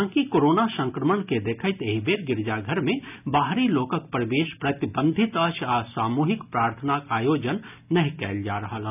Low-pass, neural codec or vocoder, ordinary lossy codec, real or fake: 3.6 kHz; none; none; real